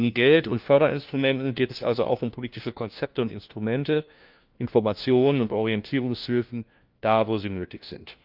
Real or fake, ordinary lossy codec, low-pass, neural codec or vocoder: fake; Opus, 24 kbps; 5.4 kHz; codec, 16 kHz, 1 kbps, FunCodec, trained on LibriTTS, 50 frames a second